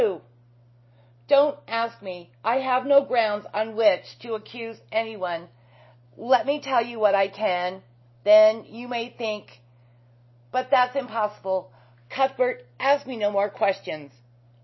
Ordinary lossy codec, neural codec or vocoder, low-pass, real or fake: MP3, 24 kbps; none; 7.2 kHz; real